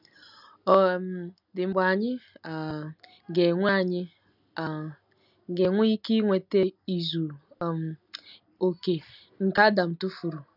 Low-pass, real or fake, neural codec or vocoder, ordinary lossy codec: 5.4 kHz; real; none; none